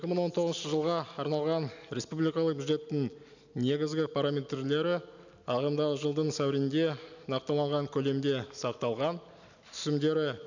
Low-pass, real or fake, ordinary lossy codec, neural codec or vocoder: 7.2 kHz; real; none; none